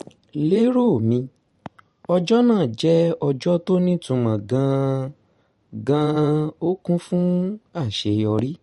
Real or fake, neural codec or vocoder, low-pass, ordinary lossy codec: fake; vocoder, 44.1 kHz, 128 mel bands every 512 samples, BigVGAN v2; 19.8 kHz; MP3, 48 kbps